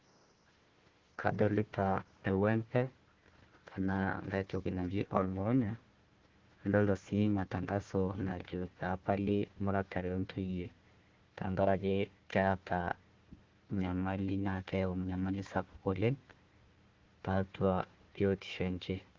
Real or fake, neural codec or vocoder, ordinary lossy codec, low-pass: fake; codec, 16 kHz, 1 kbps, FunCodec, trained on Chinese and English, 50 frames a second; Opus, 16 kbps; 7.2 kHz